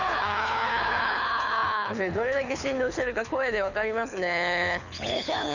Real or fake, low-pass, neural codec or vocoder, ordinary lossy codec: fake; 7.2 kHz; codec, 24 kHz, 6 kbps, HILCodec; none